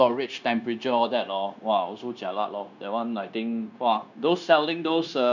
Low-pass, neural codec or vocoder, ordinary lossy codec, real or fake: 7.2 kHz; codec, 16 kHz, 0.9 kbps, LongCat-Audio-Codec; none; fake